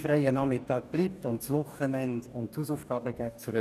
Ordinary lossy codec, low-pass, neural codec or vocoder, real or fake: none; 14.4 kHz; codec, 44.1 kHz, 2.6 kbps, DAC; fake